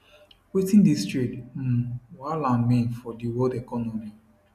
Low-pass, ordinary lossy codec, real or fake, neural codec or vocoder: 14.4 kHz; none; real; none